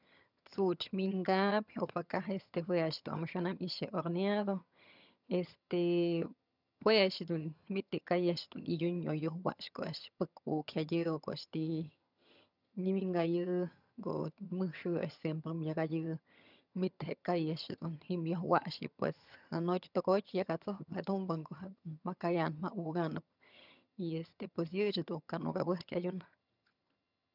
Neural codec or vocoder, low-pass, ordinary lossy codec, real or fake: vocoder, 22.05 kHz, 80 mel bands, HiFi-GAN; 5.4 kHz; none; fake